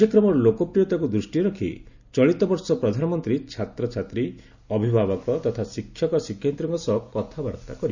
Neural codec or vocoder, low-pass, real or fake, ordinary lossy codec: none; 7.2 kHz; real; none